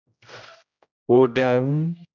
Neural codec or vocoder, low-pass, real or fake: codec, 16 kHz, 0.5 kbps, X-Codec, HuBERT features, trained on general audio; 7.2 kHz; fake